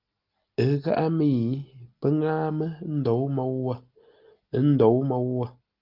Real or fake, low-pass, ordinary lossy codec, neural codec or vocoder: real; 5.4 kHz; Opus, 32 kbps; none